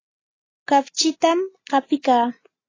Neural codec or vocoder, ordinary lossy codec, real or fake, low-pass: none; AAC, 32 kbps; real; 7.2 kHz